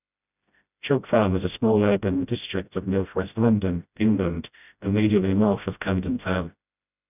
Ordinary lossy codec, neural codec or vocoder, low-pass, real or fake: none; codec, 16 kHz, 0.5 kbps, FreqCodec, smaller model; 3.6 kHz; fake